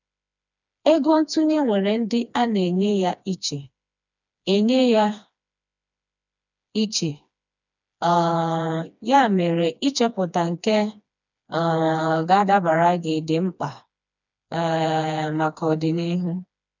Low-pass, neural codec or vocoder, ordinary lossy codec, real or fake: 7.2 kHz; codec, 16 kHz, 2 kbps, FreqCodec, smaller model; none; fake